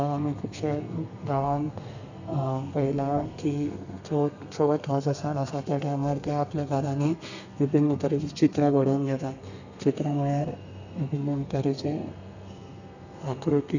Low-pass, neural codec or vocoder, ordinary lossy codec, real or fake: 7.2 kHz; codec, 32 kHz, 1.9 kbps, SNAC; none; fake